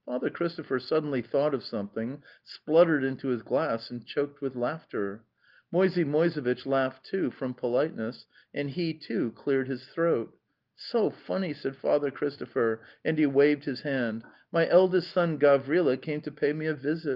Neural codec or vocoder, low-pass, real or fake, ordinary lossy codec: none; 5.4 kHz; real; Opus, 32 kbps